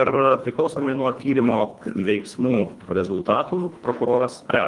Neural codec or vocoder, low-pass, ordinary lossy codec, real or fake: codec, 24 kHz, 1.5 kbps, HILCodec; 10.8 kHz; Opus, 32 kbps; fake